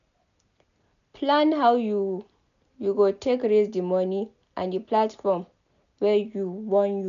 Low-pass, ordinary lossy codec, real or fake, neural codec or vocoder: 7.2 kHz; none; real; none